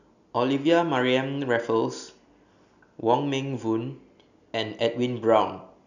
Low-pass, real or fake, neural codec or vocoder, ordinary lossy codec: 7.2 kHz; real; none; none